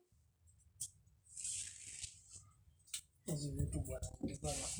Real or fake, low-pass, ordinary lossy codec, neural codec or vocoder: real; none; none; none